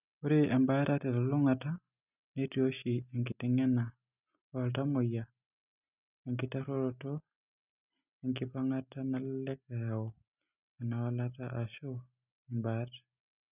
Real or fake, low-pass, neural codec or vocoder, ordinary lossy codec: real; 3.6 kHz; none; none